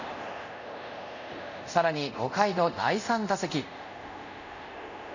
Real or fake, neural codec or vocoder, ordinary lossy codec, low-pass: fake; codec, 24 kHz, 0.5 kbps, DualCodec; none; 7.2 kHz